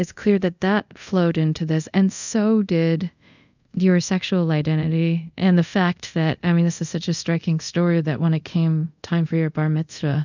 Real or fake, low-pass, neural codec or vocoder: fake; 7.2 kHz; codec, 24 kHz, 0.5 kbps, DualCodec